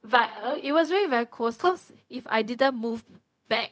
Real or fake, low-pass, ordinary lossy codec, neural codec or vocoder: fake; none; none; codec, 16 kHz, 0.4 kbps, LongCat-Audio-Codec